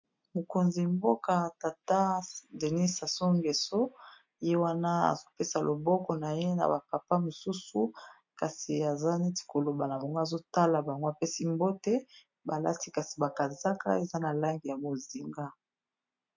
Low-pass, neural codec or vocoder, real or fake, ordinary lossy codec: 7.2 kHz; none; real; MP3, 48 kbps